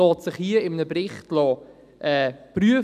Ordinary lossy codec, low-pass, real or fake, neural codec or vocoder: none; 14.4 kHz; real; none